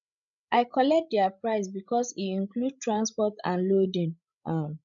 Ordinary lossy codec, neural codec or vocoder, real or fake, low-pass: none; codec, 16 kHz, 16 kbps, FreqCodec, larger model; fake; 7.2 kHz